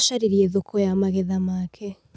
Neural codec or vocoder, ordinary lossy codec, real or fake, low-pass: none; none; real; none